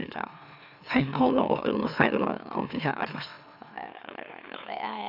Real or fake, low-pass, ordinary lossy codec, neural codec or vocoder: fake; 5.4 kHz; none; autoencoder, 44.1 kHz, a latent of 192 numbers a frame, MeloTTS